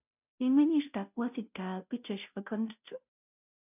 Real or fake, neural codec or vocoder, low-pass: fake; codec, 16 kHz, 0.5 kbps, FunCodec, trained on Chinese and English, 25 frames a second; 3.6 kHz